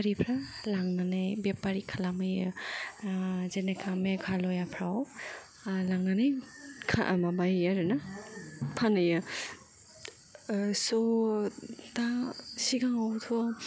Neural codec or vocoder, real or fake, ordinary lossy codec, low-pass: none; real; none; none